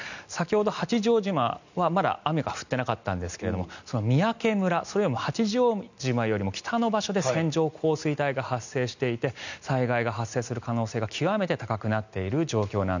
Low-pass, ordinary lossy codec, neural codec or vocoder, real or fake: 7.2 kHz; none; none; real